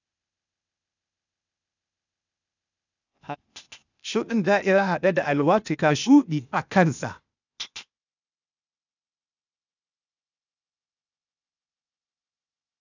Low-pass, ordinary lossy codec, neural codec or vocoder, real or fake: 7.2 kHz; none; codec, 16 kHz, 0.8 kbps, ZipCodec; fake